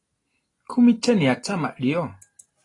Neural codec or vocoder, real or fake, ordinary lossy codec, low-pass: none; real; AAC, 32 kbps; 10.8 kHz